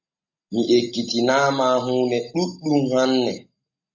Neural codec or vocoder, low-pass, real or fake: none; 7.2 kHz; real